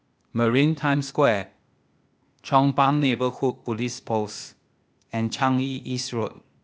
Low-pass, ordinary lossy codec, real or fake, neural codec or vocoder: none; none; fake; codec, 16 kHz, 0.8 kbps, ZipCodec